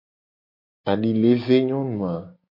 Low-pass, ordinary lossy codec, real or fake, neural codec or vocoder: 5.4 kHz; MP3, 32 kbps; real; none